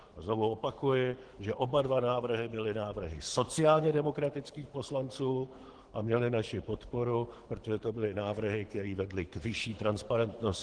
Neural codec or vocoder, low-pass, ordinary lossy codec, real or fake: codec, 24 kHz, 6 kbps, HILCodec; 9.9 kHz; Opus, 16 kbps; fake